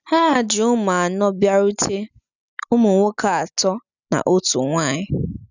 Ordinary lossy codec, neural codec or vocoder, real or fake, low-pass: none; none; real; 7.2 kHz